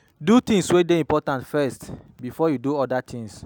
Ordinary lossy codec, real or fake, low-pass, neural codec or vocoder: none; real; 19.8 kHz; none